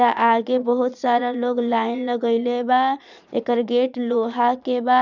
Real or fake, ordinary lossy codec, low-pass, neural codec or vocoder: fake; none; 7.2 kHz; vocoder, 22.05 kHz, 80 mel bands, Vocos